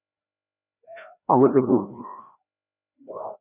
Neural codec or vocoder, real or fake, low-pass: codec, 16 kHz, 1 kbps, FreqCodec, larger model; fake; 3.6 kHz